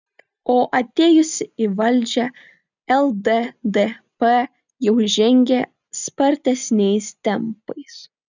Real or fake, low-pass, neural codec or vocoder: real; 7.2 kHz; none